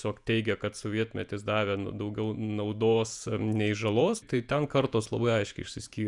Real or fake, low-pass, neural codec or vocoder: fake; 10.8 kHz; vocoder, 48 kHz, 128 mel bands, Vocos